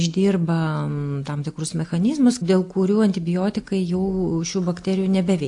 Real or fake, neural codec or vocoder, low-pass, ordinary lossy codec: real; none; 10.8 kHz; AAC, 64 kbps